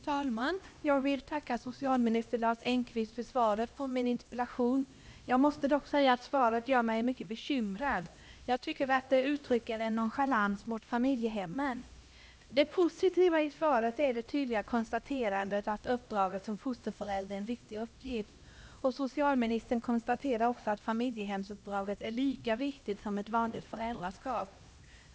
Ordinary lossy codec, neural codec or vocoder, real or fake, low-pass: none; codec, 16 kHz, 1 kbps, X-Codec, HuBERT features, trained on LibriSpeech; fake; none